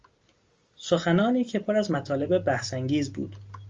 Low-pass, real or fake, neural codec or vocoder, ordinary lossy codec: 7.2 kHz; real; none; Opus, 32 kbps